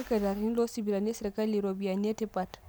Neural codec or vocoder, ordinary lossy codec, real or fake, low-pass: none; none; real; none